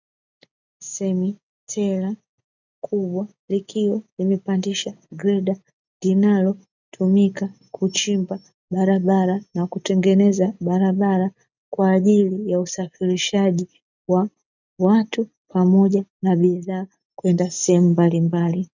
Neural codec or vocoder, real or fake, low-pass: none; real; 7.2 kHz